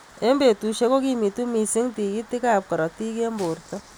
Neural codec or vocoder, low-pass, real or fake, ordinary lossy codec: none; none; real; none